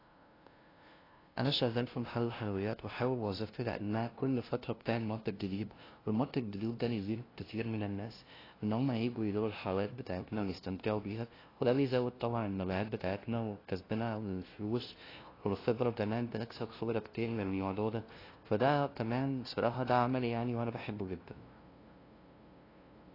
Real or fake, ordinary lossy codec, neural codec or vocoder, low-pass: fake; AAC, 24 kbps; codec, 16 kHz, 0.5 kbps, FunCodec, trained on LibriTTS, 25 frames a second; 5.4 kHz